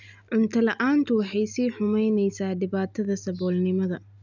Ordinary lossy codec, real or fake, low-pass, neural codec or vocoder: none; real; 7.2 kHz; none